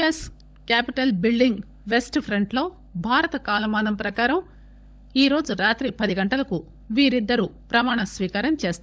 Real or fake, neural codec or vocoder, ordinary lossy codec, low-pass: fake; codec, 16 kHz, 16 kbps, FunCodec, trained on LibriTTS, 50 frames a second; none; none